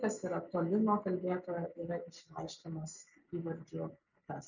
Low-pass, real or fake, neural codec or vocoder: 7.2 kHz; real; none